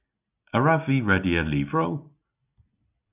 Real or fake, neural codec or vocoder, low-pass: real; none; 3.6 kHz